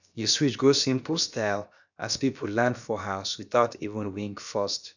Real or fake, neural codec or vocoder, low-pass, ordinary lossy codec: fake; codec, 16 kHz, about 1 kbps, DyCAST, with the encoder's durations; 7.2 kHz; none